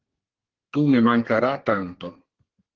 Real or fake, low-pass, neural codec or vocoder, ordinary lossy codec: fake; 7.2 kHz; codec, 32 kHz, 1.9 kbps, SNAC; Opus, 16 kbps